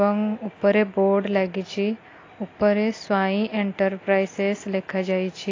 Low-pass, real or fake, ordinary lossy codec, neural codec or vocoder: 7.2 kHz; real; AAC, 32 kbps; none